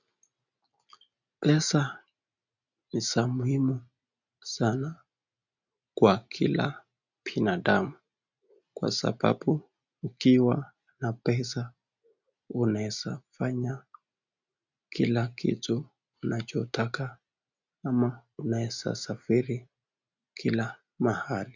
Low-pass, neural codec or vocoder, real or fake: 7.2 kHz; none; real